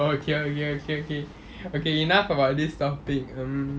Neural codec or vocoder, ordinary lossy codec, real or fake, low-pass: none; none; real; none